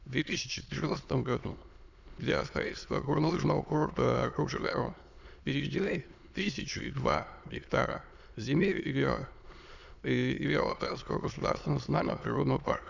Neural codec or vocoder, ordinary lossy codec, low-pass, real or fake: autoencoder, 22.05 kHz, a latent of 192 numbers a frame, VITS, trained on many speakers; none; 7.2 kHz; fake